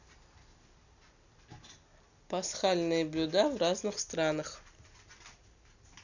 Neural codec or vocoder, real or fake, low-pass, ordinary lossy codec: none; real; 7.2 kHz; none